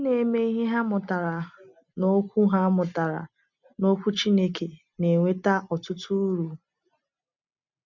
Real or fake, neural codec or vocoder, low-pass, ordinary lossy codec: real; none; 7.2 kHz; none